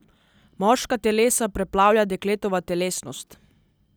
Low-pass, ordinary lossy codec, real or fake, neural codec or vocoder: none; none; real; none